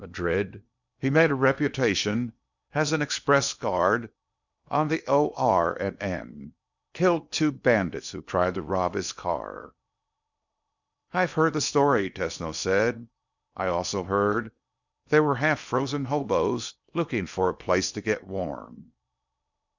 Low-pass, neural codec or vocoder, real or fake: 7.2 kHz; codec, 16 kHz in and 24 kHz out, 0.8 kbps, FocalCodec, streaming, 65536 codes; fake